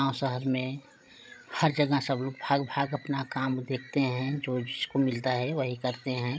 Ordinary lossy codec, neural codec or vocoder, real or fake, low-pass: none; codec, 16 kHz, 16 kbps, FreqCodec, larger model; fake; none